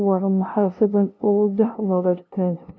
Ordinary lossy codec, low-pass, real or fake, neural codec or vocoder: none; none; fake; codec, 16 kHz, 0.5 kbps, FunCodec, trained on LibriTTS, 25 frames a second